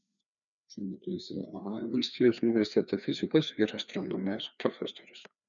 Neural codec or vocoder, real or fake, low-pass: codec, 16 kHz, 2 kbps, FreqCodec, larger model; fake; 7.2 kHz